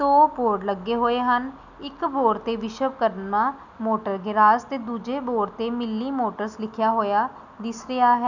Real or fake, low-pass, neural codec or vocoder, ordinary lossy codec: real; 7.2 kHz; none; none